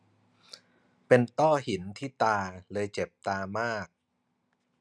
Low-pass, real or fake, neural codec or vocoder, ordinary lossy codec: none; real; none; none